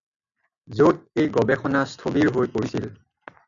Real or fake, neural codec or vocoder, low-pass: real; none; 7.2 kHz